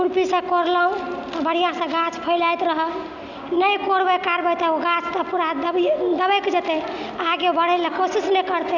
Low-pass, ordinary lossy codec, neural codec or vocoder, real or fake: 7.2 kHz; none; none; real